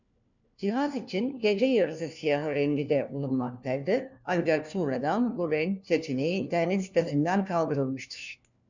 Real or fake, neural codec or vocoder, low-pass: fake; codec, 16 kHz, 1 kbps, FunCodec, trained on LibriTTS, 50 frames a second; 7.2 kHz